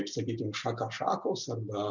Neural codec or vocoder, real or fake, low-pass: none; real; 7.2 kHz